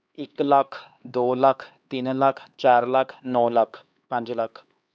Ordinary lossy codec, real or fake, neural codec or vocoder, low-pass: none; fake; codec, 16 kHz, 2 kbps, X-Codec, HuBERT features, trained on LibriSpeech; none